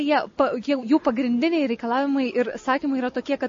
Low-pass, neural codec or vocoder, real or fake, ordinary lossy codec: 7.2 kHz; none; real; MP3, 32 kbps